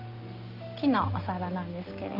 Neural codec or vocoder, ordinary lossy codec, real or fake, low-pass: none; Opus, 32 kbps; real; 5.4 kHz